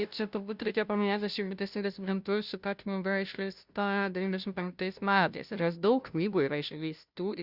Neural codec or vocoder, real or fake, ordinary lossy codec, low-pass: codec, 16 kHz, 0.5 kbps, FunCodec, trained on Chinese and English, 25 frames a second; fake; Opus, 64 kbps; 5.4 kHz